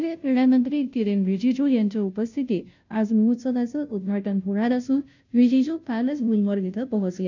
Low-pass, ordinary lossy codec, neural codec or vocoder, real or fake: 7.2 kHz; none; codec, 16 kHz, 0.5 kbps, FunCodec, trained on Chinese and English, 25 frames a second; fake